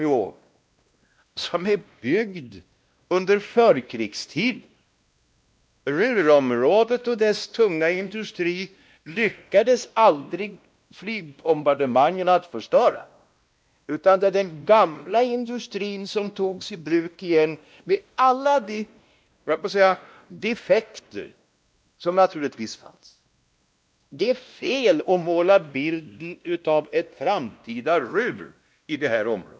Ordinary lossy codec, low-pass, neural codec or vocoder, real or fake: none; none; codec, 16 kHz, 1 kbps, X-Codec, WavLM features, trained on Multilingual LibriSpeech; fake